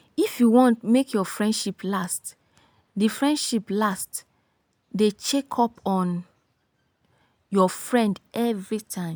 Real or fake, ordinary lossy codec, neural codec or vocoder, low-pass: real; none; none; none